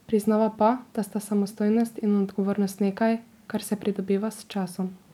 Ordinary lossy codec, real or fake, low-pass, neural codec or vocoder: none; fake; 19.8 kHz; vocoder, 44.1 kHz, 128 mel bands every 256 samples, BigVGAN v2